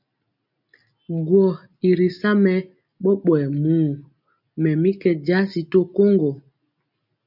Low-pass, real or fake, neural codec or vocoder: 5.4 kHz; real; none